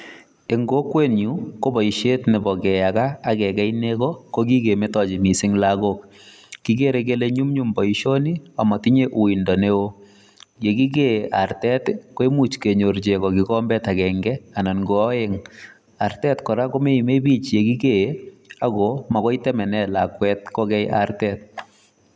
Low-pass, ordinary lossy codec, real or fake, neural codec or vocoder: none; none; real; none